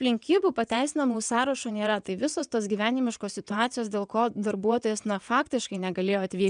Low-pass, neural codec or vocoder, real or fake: 9.9 kHz; vocoder, 22.05 kHz, 80 mel bands, WaveNeXt; fake